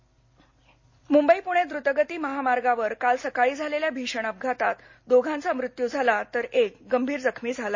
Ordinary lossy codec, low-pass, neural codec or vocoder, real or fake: MP3, 32 kbps; 7.2 kHz; none; real